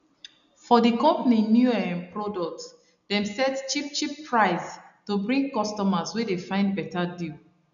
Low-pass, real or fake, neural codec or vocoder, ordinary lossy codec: 7.2 kHz; real; none; none